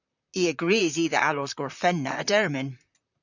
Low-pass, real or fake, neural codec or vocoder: 7.2 kHz; fake; vocoder, 44.1 kHz, 128 mel bands, Pupu-Vocoder